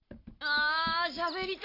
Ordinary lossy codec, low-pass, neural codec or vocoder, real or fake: AAC, 32 kbps; 5.4 kHz; none; real